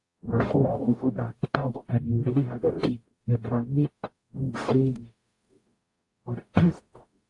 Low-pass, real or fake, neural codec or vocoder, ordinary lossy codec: 10.8 kHz; fake; codec, 44.1 kHz, 0.9 kbps, DAC; AAC, 48 kbps